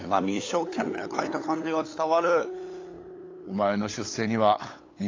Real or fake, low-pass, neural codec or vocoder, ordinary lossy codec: fake; 7.2 kHz; codec, 16 kHz, 8 kbps, FunCodec, trained on LibriTTS, 25 frames a second; AAC, 32 kbps